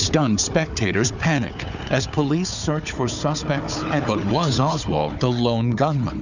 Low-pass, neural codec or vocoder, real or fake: 7.2 kHz; codec, 16 kHz, 4 kbps, FunCodec, trained on Chinese and English, 50 frames a second; fake